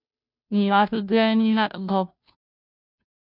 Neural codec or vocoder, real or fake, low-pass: codec, 16 kHz, 0.5 kbps, FunCodec, trained on Chinese and English, 25 frames a second; fake; 5.4 kHz